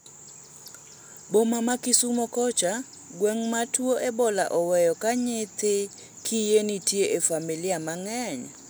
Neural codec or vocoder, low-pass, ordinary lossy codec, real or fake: none; none; none; real